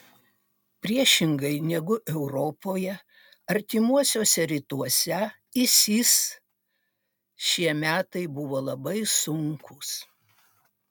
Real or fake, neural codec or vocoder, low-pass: real; none; 19.8 kHz